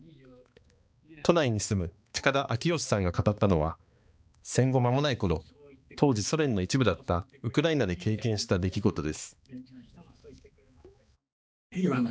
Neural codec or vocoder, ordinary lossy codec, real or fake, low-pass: codec, 16 kHz, 2 kbps, X-Codec, HuBERT features, trained on balanced general audio; none; fake; none